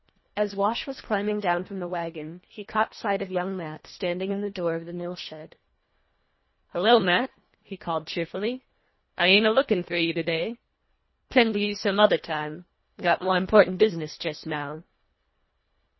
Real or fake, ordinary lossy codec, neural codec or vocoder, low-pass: fake; MP3, 24 kbps; codec, 24 kHz, 1.5 kbps, HILCodec; 7.2 kHz